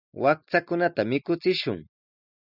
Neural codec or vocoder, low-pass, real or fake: none; 5.4 kHz; real